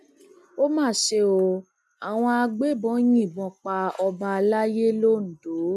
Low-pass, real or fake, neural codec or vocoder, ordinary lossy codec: none; real; none; none